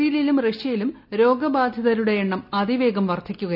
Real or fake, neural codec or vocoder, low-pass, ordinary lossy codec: real; none; 5.4 kHz; none